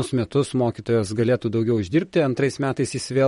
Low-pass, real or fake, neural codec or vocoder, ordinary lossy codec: 19.8 kHz; fake; vocoder, 44.1 kHz, 128 mel bands, Pupu-Vocoder; MP3, 48 kbps